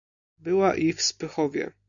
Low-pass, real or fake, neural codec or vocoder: 7.2 kHz; real; none